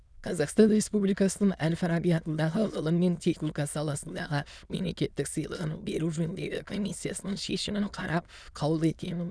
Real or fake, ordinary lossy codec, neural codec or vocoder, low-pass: fake; none; autoencoder, 22.05 kHz, a latent of 192 numbers a frame, VITS, trained on many speakers; none